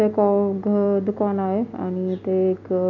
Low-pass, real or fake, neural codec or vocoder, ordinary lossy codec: 7.2 kHz; real; none; none